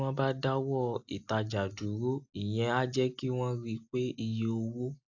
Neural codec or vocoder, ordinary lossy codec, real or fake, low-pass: none; AAC, 48 kbps; real; 7.2 kHz